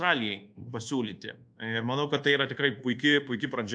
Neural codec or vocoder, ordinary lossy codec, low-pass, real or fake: codec, 24 kHz, 1.2 kbps, DualCodec; AAC, 64 kbps; 9.9 kHz; fake